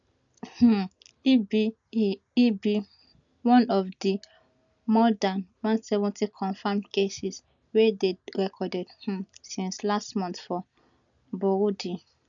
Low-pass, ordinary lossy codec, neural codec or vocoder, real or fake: 7.2 kHz; none; none; real